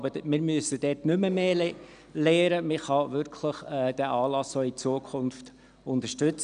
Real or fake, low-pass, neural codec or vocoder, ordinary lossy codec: real; 9.9 kHz; none; none